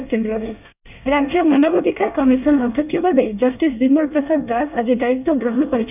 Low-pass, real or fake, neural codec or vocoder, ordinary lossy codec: 3.6 kHz; fake; codec, 24 kHz, 1 kbps, SNAC; none